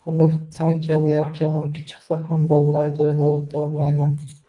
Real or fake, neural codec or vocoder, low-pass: fake; codec, 24 kHz, 1.5 kbps, HILCodec; 10.8 kHz